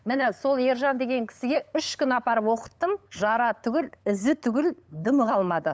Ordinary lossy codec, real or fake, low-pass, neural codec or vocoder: none; fake; none; codec, 16 kHz, 8 kbps, FunCodec, trained on LibriTTS, 25 frames a second